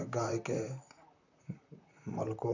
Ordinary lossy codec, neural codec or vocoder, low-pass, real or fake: none; vocoder, 44.1 kHz, 128 mel bands, Pupu-Vocoder; 7.2 kHz; fake